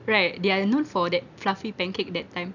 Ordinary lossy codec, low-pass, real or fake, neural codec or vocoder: none; 7.2 kHz; real; none